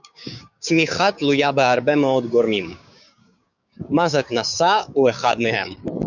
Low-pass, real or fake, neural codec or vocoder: 7.2 kHz; fake; codec, 16 kHz, 6 kbps, DAC